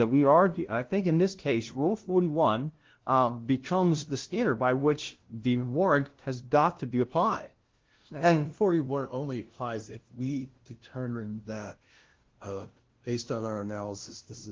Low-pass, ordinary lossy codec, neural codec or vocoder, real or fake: 7.2 kHz; Opus, 16 kbps; codec, 16 kHz, 0.5 kbps, FunCodec, trained on LibriTTS, 25 frames a second; fake